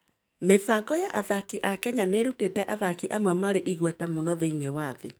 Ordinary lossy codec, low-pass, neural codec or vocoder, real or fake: none; none; codec, 44.1 kHz, 2.6 kbps, SNAC; fake